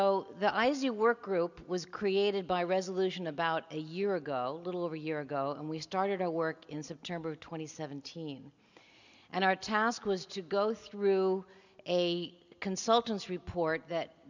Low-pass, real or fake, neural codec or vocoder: 7.2 kHz; real; none